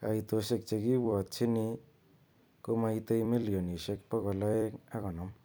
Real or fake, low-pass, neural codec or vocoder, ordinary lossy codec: fake; none; vocoder, 44.1 kHz, 128 mel bands every 256 samples, BigVGAN v2; none